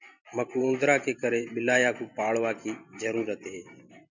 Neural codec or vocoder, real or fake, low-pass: vocoder, 44.1 kHz, 128 mel bands every 512 samples, BigVGAN v2; fake; 7.2 kHz